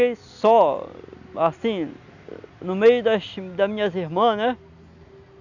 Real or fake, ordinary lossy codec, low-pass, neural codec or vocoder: real; none; 7.2 kHz; none